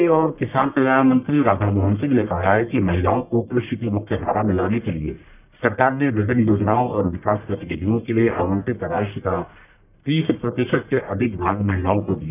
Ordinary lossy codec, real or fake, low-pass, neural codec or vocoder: none; fake; 3.6 kHz; codec, 44.1 kHz, 1.7 kbps, Pupu-Codec